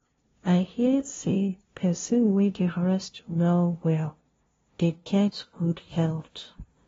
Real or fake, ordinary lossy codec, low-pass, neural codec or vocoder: fake; AAC, 24 kbps; 7.2 kHz; codec, 16 kHz, 0.5 kbps, FunCodec, trained on LibriTTS, 25 frames a second